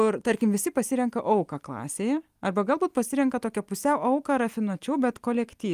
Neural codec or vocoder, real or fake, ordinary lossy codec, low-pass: none; real; Opus, 32 kbps; 14.4 kHz